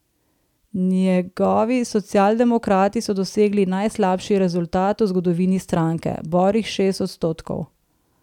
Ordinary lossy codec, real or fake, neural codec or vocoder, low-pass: none; real; none; 19.8 kHz